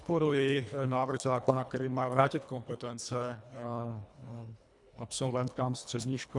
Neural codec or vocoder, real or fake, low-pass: codec, 24 kHz, 1.5 kbps, HILCodec; fake; 10.8 kHz